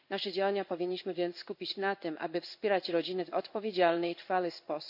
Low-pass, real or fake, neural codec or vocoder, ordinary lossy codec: 5.4 kHz; fake; codec, 16 kHz in and 24 kHz out, 1 kbps, XY-Tokenizer; none